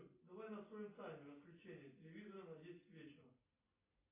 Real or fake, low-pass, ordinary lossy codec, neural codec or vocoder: real; 3.6 kHz; AAC, 16 kbps; none